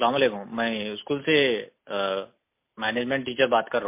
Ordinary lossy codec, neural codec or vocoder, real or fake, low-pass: MP3, 24 kbps; none; real; 3.6 kHz